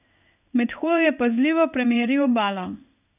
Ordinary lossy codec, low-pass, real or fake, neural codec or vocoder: none; 3.6 kHz; fake; codec, 16 kHz in and 24 kHz out, 1 kbps, XY-Tokenizer